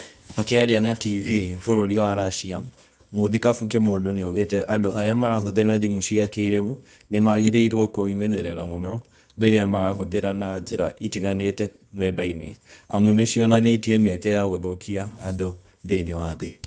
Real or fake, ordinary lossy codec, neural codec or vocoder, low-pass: fake; none; codec, 24 kHz, 0.9 kbps, WavTokenizer, medium music audio release; none